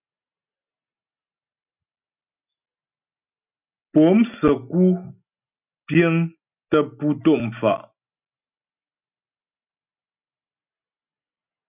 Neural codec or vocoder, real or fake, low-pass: none; real; 3.6 kHz